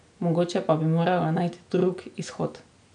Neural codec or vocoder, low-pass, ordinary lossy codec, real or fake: none; 9.9 kHz; none; real